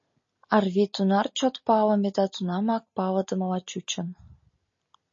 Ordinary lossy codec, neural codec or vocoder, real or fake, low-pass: MP3, 32 kbps; none; real; 7.2 kHz